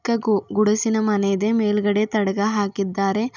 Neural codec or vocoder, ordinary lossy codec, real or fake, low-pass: none; none; real; 7.2 kHz